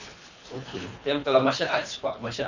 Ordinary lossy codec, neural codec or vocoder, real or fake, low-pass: none; codec, 24 kHz, 3 kbps, HILCodec; fake; 7.2 kHz